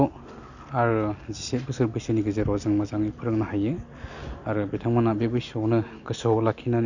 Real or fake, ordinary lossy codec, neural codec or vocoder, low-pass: real; none; none; 7.2 kHz